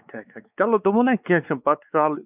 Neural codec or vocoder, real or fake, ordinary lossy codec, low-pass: codec, 16 kHz, 4 kbps, X-Codec, WavLM features, trained on Multilingual LibriSpeech; fake; none; 3.6 kHz